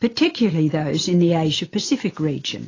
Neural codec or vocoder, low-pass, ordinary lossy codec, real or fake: none; 7.2 kHz; AAC, 32 kbps; real